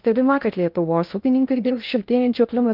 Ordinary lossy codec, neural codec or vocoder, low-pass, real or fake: Opus, 24 kbps; codec, 16 kHz in and 24 kHz out, 0.6 kbps, FocalCodec, streaming, 2048 codes; 5.4 kHz; fake